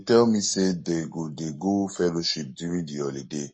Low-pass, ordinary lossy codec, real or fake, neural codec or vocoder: 9.9 kHz; MP3, 32 kbps; fake; codec, 44.1 kHz, 7.8 kbps, Pupu-Codec